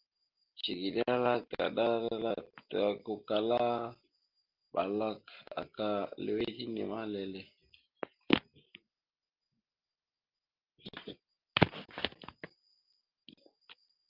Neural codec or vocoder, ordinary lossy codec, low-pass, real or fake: none; Opus, 32 kbps; 5.4 kHz; real